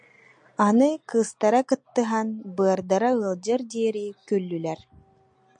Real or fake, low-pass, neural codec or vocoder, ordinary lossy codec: real; 9.9 kHz; none; MP3, 64 kbps